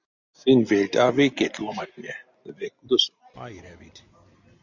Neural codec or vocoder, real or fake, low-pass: none; real; 7.2 kHz